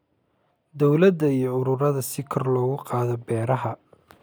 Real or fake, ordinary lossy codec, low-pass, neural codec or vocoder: real; none; none; none